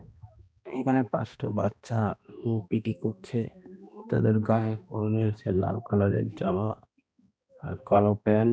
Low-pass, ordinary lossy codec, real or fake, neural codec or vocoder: none; none; fake; codec, 16 kHz, 1 kbps, X-Codec, HuBERT features, trained on balanced general audio